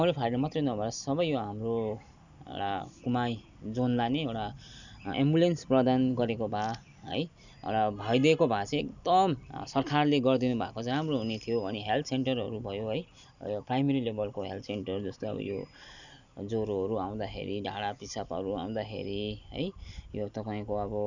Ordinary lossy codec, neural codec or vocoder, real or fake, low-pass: none; none; real; 7.2 kHz